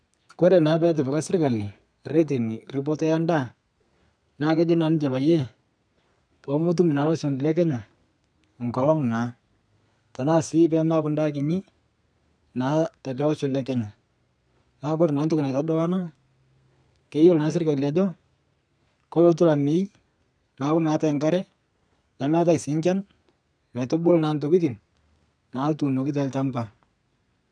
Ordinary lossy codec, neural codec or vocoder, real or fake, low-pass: none; codec, 32 kHz, 1.9 kbps, SNAC; fake; 9.9 kHz